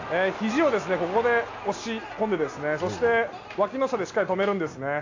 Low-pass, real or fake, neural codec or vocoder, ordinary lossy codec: 7.2 kHz; real; none; AAC, 48 kbps